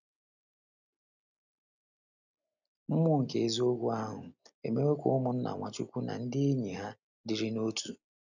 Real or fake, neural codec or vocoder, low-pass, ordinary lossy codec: real; none; 7.2 kHz; none